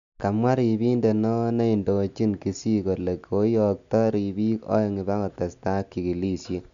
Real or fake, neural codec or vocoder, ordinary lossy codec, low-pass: real; none; none; 7.2 kHz